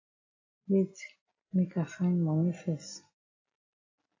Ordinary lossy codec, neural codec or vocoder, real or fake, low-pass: AAC, 32 kbps; none; real; 7.2 kHz